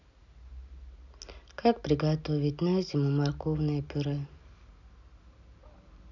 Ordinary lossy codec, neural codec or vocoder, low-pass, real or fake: none; none; 7.2 kHz; real